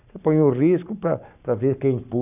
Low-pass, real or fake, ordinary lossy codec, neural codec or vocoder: 3.6 kHz; fake; none; codec, 44.1 kHz, 7.8 kbps, Pupu-Codec